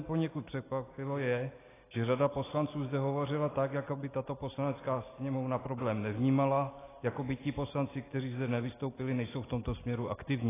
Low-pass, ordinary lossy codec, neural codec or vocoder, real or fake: 3.6 kHz; AAC, 16 kbps; none; real